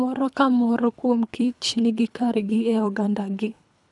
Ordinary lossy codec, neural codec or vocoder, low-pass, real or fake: none; codec, 24 kHz, 3 kbps, HILCodec; 10.8 kHz; fake